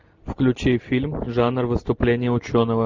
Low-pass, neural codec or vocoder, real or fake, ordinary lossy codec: 7.2 kHz; none; real; Opus, 32 kbps